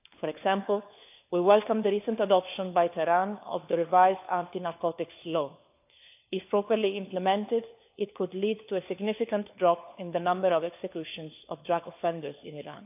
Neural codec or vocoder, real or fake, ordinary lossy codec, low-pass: codec, 16 kHz, 4 kbps, FunCodec, trained on LibriTTS, 50 frames a second; fake; AAC, 32 kbps; 3.6 kHz